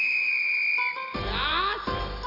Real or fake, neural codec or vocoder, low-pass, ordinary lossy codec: fake; vocoder, 44.1 kHz, 128 mel bands every 256 samples, BigVGAN v2; 5.4 kHz; MP3, 48 kbps